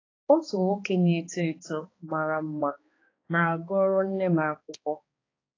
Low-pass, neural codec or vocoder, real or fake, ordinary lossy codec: 7.2 kHz; codec, 16 kHz, 2 kbps, X-Codec, HuBERT features, trained on balanced general audio; fake; AAC, 32 kbps